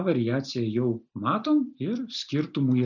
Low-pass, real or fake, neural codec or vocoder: 7.2 kHz; real; none